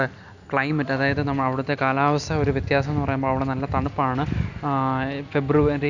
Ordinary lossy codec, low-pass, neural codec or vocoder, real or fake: none; 7.2 kHz; none; real